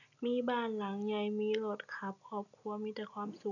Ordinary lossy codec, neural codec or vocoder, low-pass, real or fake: none; none; 7.2 kHz; real